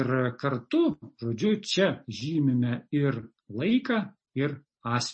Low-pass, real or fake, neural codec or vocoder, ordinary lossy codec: 9.9 kHz; real; none; MP3, 32 kbps